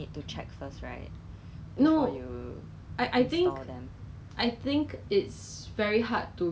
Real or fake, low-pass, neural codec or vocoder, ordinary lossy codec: real; none; none; none